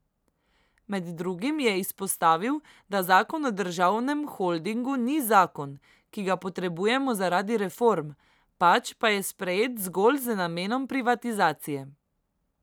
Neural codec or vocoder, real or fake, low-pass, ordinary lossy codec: none; real; none; none